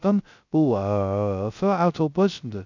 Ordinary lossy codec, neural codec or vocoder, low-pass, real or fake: none; codec, 16 kHz, 0.2 kbps, FocalCodec; 7.2 kHz; fake